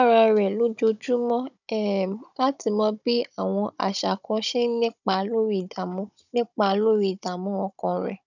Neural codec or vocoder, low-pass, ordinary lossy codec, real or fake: codec, 16 kHz, 16 kbps, FunCodec, trained on Chinese and English, 50 frames a second; 7.2 kHz; none; fake